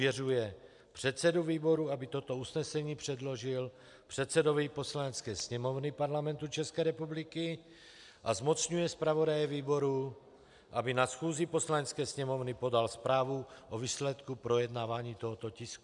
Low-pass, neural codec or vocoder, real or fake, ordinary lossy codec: 10.8 kHz; none; real; MP3, 96 kbps